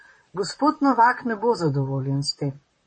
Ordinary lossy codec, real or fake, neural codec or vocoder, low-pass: MP3, 32 kbps; fake; vocoder, 44.1 kHz, 128 mel bands, Pupu-Vocoder; 9.9 kHz